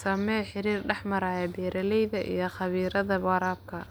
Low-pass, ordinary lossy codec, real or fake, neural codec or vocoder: none; none; real; none